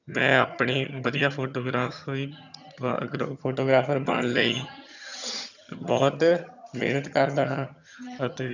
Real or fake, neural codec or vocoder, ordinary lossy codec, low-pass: fake; vocoder, 22.05 kHz, 80 mel bands, HiFi-GAN; none; 7.2 kHz